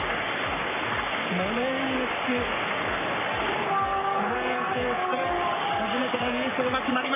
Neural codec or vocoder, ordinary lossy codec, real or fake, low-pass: codec, 44.1 kHz, 3.4 kbps, Pupu-Codec; none; fake; 3.6 kHz